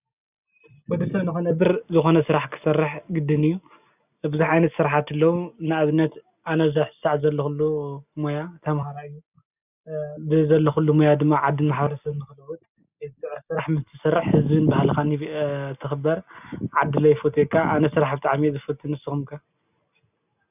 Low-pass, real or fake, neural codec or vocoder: 3.6 kHz; real; none